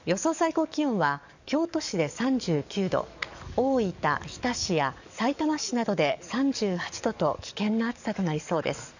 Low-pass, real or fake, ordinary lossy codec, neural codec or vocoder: 7.2 kHz; fake; none; codec, 44.1 kHz, 7.8 kbps, DAC